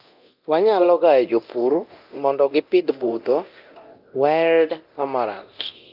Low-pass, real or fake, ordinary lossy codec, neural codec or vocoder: 5.4 kHz; fake; Opus, 24 kbps; codec, 24 kHz, 0.9 kbps, DualCodec